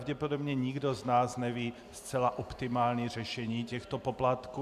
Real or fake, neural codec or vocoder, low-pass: real; none; 14.4 kHz